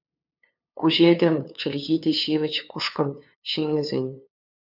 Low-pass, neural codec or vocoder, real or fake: 5.4 kHz; codec, 16 kHz, 2 kbps, FunCodec, trained on LibriTTS, 25 frames a second; fake